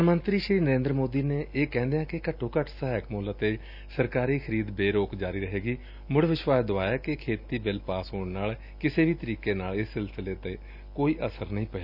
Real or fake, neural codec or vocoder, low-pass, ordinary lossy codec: real; none; 5.4 kHz; none